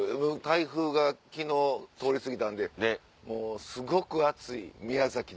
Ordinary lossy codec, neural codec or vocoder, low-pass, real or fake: none; none; none; real